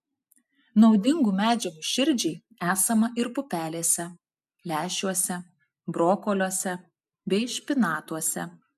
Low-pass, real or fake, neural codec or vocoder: 14.4 kHz; real; none